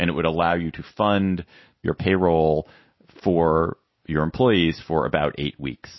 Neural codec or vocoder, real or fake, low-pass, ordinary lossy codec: none; real; 7.2 kHz; MP3, 24 kbps